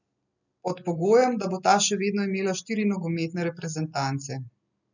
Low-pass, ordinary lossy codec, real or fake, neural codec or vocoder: 7.2 kHz; none; real; none